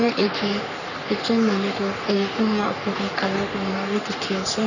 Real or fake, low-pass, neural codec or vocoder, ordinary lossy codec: fake; 7.2 kHz; codec, 44.1 kHz, 3.4 kbps, Pupu-Codec; none